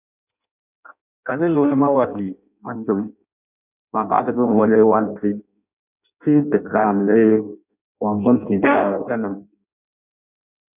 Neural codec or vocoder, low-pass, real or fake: codec, 16 kHz in and 24 kHz out, 0.6 kbps, FireRedTTS-2 codec; 3.6 kHz; fake